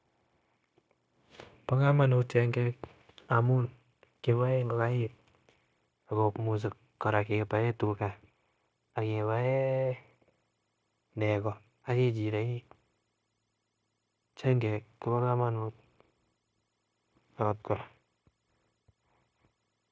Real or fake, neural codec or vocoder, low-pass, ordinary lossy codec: fake; codec, 16 kHz, 0.9 kbps, LongCat-Audio-Codec; none; none